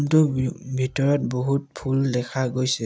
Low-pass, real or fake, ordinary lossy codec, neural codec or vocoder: none; real; none; none